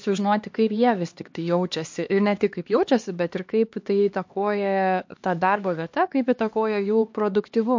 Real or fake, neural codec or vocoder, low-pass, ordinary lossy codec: fake; codec, 16 kHz, 2 kbps, X-Codec, HuBERT features, trained on LibriSpeech; 7.2 kHz; MP3, 48 kbps